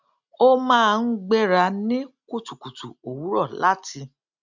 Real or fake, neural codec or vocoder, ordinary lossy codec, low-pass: real; none; none; 7.2 kHz